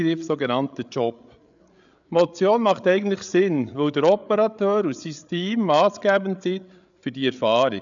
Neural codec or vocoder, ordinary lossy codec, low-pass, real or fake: codec, 16 kHz, 8 kbps, FreqCodec, larger model; none; 7.2 kHz; fake